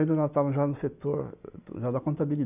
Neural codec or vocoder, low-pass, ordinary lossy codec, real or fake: none; 3.6 kHz; none; real